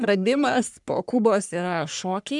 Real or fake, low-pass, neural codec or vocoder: fake; 10.8 kHz; codec, 44.1 kHz, 3.4 kbps, Pupu-Codec